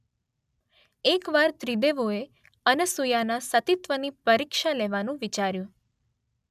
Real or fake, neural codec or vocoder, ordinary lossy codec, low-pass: real; none; none; 14.4 kHz